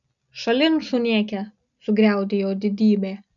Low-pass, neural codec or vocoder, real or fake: 7.2 kHz; none; real